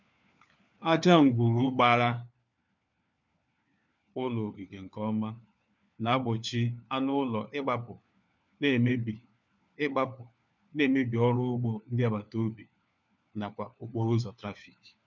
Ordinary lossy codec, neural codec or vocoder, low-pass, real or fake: none; codec, 16 kHz, 4 kbps, FunCodec, trained on LibriTTS, 50 frames a second; 7.2 kHz; fake